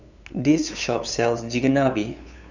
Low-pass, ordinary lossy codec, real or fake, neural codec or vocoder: 7.2 kHz; none; fake; codec, 16 kHz, 8 kbps, FunCodec, trained on LibriTTS, 25 frames a second